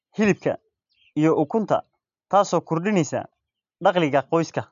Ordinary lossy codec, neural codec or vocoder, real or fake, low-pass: none; none; real; 7.2 kHz